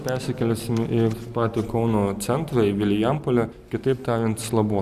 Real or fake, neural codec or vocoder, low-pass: real; none; 14.4 kHz